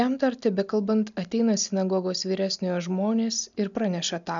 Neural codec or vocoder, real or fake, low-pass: none; real; 7.2 kHz